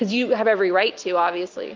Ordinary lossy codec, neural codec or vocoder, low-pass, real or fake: Opus, 32 kbps; none; 7.2 kHz; real